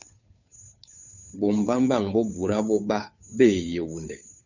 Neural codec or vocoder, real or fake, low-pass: codec, 16 kHz, 2 kbps, FunCodec, trained on Chinese and English, 25 frames a second; fake; 7.2 kHz